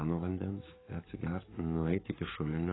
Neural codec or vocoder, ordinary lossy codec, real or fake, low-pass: codec, 44.1 kHz, 7.8 kbps, DAC; AAC, 16 kbps; fake; 7.2 kHz